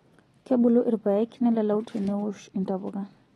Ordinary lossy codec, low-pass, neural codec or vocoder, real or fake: AAC, 32 kbps; 19.8 kHz; none; real